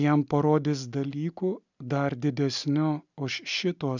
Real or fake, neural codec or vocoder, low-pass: real; none; 7.2 kHz